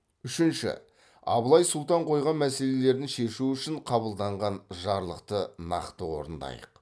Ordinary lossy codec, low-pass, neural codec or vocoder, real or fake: none; none; none; real